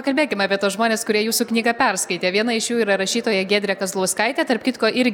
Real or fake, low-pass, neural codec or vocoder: real; 19.8 kHz; none